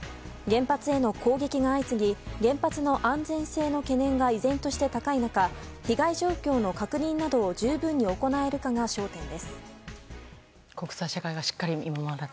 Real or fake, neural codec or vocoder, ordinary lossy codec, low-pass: real; none; none; none